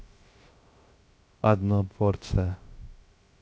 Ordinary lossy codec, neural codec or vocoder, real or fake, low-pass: none; codec, 16 kHz, 0.3 kbps, FocalCodec; fake; none